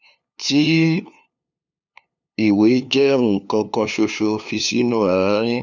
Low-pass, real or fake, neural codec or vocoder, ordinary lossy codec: 7.2 kHz; fake; codec, 16 kHz, 2 kbps, FunCodec, trained on LibriTTS, 25 frames a second; none